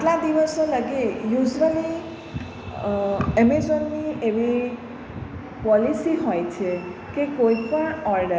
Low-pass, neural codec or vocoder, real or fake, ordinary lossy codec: none; none; real; none